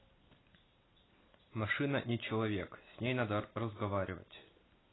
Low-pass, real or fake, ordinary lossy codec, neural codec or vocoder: 7.2 kHz; real; AAC, 16 kbps; none